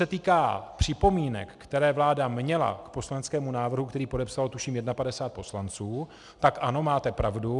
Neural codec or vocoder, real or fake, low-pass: none; real; 10.8 kHz